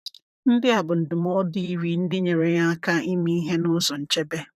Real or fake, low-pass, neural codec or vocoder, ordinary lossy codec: fake; 14.4 kHz; vocoder, 44.1 kHz, 128 mel bands, Pupu-Vocoder; none